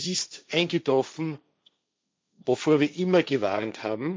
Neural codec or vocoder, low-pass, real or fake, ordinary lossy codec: codec, 16 kHz, 1.1 kbps, Voila-Tokenizer; none; fake; none